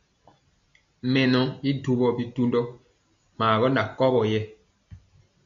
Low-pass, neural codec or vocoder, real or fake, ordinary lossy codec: 7.2 kHz; none; real; MP3, 64 kbps